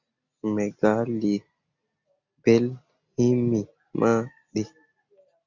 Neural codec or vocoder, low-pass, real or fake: none; 7.2 kHz; real